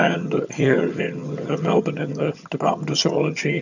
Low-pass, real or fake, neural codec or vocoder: 7.2 kHz; fake; vocoder, 22.05 kHz, 80 mel bands, HiFi-GAN